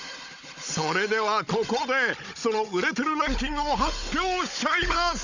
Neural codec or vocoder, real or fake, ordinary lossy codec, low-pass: codec, 16 kHz, 16 kbps, FunCodec, trained on Chinese and English, 50 frames a second; fake; none; 7.2 kHz